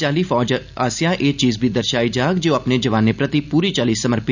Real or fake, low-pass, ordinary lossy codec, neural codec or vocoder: real; 7.2 kHz; none; none